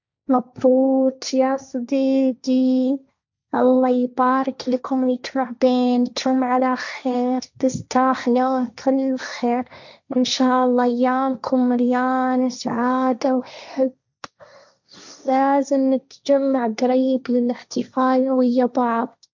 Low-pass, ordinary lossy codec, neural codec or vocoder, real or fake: 7.2 kHz; none; codec, 16 kHz, 1.1 kbps, Voila-Tokenizer; fake